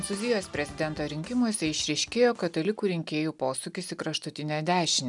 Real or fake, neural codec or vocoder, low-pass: real; none; 10.8 kHz